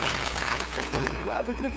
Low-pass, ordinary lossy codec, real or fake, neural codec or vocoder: none; none; fake; codec, 16 kHz, 2 kbps, FunCodec, trained on LibriTTS, 25 frames a second